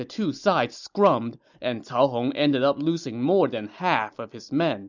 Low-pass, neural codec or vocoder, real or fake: 7.2 kHz; none; real